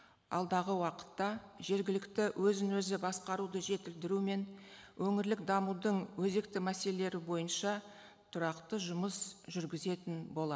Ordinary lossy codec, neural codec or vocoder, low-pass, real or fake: none; none; none; real